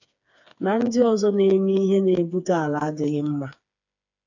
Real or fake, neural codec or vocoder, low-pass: fake; codec, 16 kHz, 4 kbps, FreqCodec, smaller model; 7.2 kHz